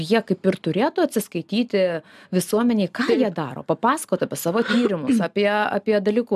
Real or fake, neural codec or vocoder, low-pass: real; none; 14.4 kHz